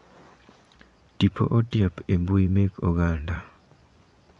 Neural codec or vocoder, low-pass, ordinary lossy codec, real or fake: vocoder, 24 kHz, 100 mel bands, Vocos; 10.8 kHz; none; fake